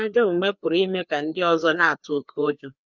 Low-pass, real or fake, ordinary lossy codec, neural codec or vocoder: 7.2 kHz; fake; none; codec, 16 kHz, 2 kbps, FreqCodec, larger model